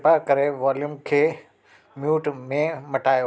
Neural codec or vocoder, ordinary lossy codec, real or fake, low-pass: none; none; real; none